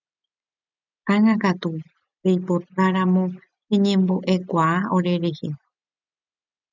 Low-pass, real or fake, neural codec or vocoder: 7.2 kHz; real; none